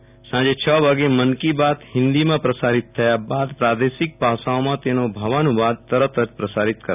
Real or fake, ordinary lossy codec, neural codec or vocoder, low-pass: real; none; none; 3.6 kHz